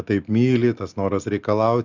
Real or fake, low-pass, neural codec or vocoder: real; 7.2 kHz; none